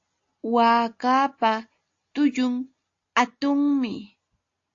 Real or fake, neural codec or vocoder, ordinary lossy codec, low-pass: real; none; AAC, 48 kbps; 7.2 kHz